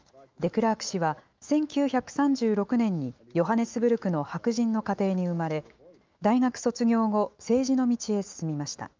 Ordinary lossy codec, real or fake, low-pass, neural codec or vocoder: Opus, 32 kbps; fake; 7.2 kHz; autoencoder, 48 kHz, 128 numbers a frame, DAC-VAE, trained on Japanese speech